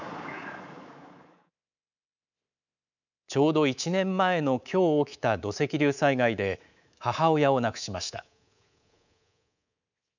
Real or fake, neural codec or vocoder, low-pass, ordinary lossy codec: fake; codec, 24 kHz, 3.1 kbps, DualCodec; 7.2 kHz; none